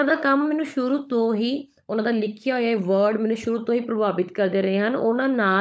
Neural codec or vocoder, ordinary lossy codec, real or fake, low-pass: codec, 16 kHz, 16 kbps, FunCodec, trained on LibriTTS, 50 frames a second; none; fake; none